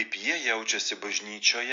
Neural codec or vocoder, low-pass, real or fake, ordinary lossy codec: none; 7.2 kHz; real; AAC, 64 kbps